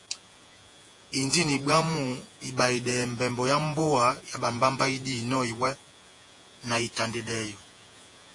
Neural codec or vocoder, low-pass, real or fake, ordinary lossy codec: vocoder, 48 kHz, 128 mel bands, Vocos; 10.8 kHz; fake; AAC, 48 kbps